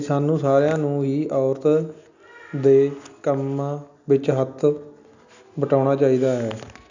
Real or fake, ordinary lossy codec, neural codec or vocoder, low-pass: real; none; none; 7.2 kHz